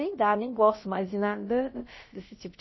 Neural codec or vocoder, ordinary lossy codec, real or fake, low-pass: codec, 16 kHz, about 1 kbps, DyCAST, with the encoder's durations; MP3, 24 kbps; fake; 7.2 kHz